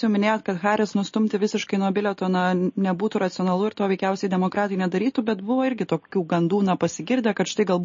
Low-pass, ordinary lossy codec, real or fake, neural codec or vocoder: 7.2 kHz; MP3, 32 kbps; real; none